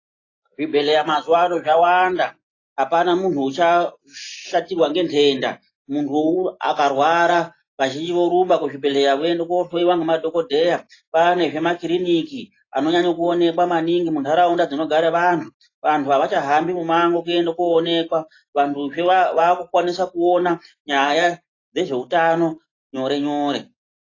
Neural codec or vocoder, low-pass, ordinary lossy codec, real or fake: none; 7.2 kHz; AAC, 32 kbps; real